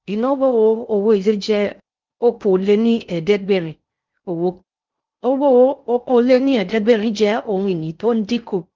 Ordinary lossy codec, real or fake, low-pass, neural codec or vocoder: Opus, 24 kbps; fake; 7.2 kHz; codec, 16 kHz in and 24 kHz out, 0.6 kbps, FocalCodec, streaming, 2048 codes